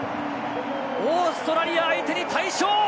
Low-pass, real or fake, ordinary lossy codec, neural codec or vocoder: none; real; none; none